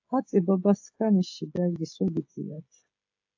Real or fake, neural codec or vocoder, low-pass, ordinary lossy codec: fake; codec, 16 kHz, 16 kbps, FreqCodec, smaller model; 7.2 kHz; AAC, 48 kbps